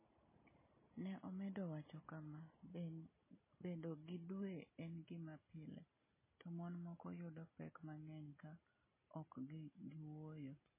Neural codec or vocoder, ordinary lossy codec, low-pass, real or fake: none; MP3, 16 kbps; 3.6 kHz; real